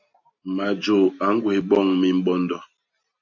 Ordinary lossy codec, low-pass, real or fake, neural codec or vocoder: AAC, 48 kbps; 7.2 kHz; real; none